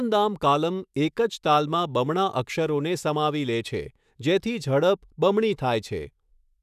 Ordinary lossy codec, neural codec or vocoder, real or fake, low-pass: none; autoencoder, 48 kHz, 128 numbers a frame, DAC-VAE, trained on Japanese speech; fake; 14.4 kHz